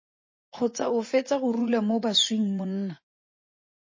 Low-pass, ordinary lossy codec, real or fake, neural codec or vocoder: 7.2 kHz; MP3, 32 kbps; fake; vocoder, 24 kHz, 100 mel bands, Vocos